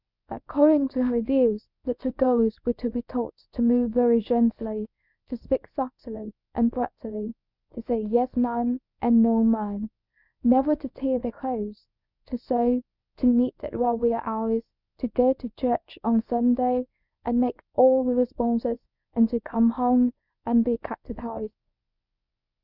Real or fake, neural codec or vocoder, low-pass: fake; codec, 24 kHz, 0.9 kbps, WavTokenizer, medium speech release version 1; 5.4 kHz